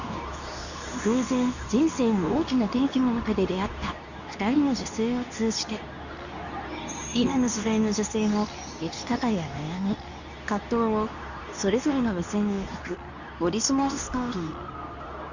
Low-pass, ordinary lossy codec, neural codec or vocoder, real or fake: 7.2 kHz; none; codec, 24 kHz, 0.9 kbps, WavTokenizer, medium speech release version 2; fake